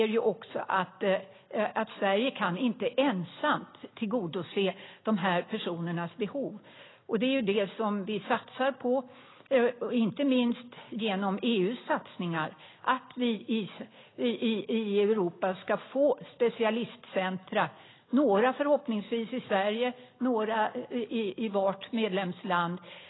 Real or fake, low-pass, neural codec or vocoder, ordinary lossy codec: real; 7.2 kHz; none; AAC, 16 kbps